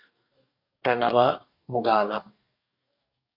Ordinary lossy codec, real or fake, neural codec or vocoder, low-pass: AAC, 48 kbps; fake; codec, 44.1 kHz, 2.6 kbps, DAC; 5.4 kHz